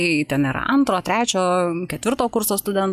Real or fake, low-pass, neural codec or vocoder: real; 14.4 kHz; none